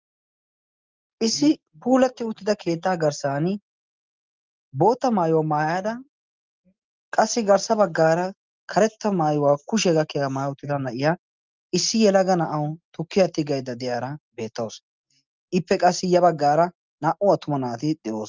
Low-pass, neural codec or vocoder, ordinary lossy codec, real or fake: 7.2 kHz; none; Opus, 24 kbps; real